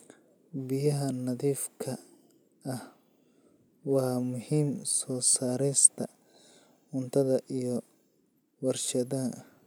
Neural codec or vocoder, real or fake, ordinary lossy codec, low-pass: none; real; none; none